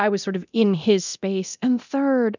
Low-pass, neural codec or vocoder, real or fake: 7.2 kHz; codec, 24 kHz, 0.9 kbps, DualCodec; fake